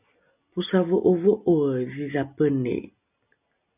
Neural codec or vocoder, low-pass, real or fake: none; 3.6 kHz; real